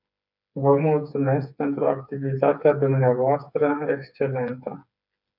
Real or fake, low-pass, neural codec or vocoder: fake; 5.4 kHz; codec, 16 kHz, 4 kbps, FreqCodec, smaller model